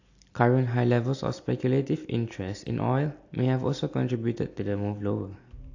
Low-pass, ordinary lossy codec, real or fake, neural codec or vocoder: 7.2 kHz; AAC, 48 kbps; real; none